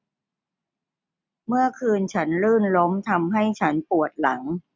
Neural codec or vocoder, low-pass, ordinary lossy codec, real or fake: none; none; none; real